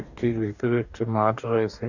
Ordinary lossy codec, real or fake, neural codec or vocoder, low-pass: none; fake; codec, 44.1 kHz, 2.6 kbps, DAC; 7.2 kHz